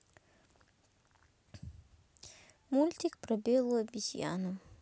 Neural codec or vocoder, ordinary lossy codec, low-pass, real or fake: none; none; none; real